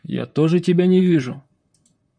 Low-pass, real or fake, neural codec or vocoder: 9.9 kHz; fake; vocoder, 44.1 kHz, 128 mel bands, Pupu-Vocoder